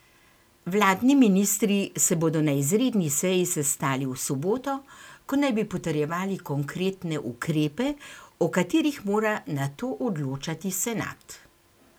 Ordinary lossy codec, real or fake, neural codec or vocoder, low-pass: none; real; none; none